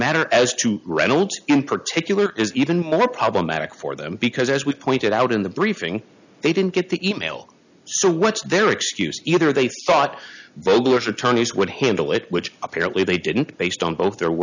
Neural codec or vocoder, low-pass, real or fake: none; 7.2 kHz; real